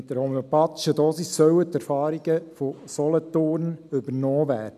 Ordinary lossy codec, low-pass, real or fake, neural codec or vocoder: none; 14.4 kHz; real; none